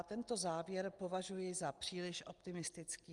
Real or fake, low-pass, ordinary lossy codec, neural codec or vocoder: real; 10.8 kHz; Opus, 32 kbps; none